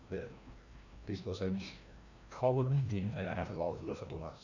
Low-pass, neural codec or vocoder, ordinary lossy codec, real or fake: 7.2 kHz; codec, 16 kHz, 1 kbps, FreqCodec, larger model; none; fake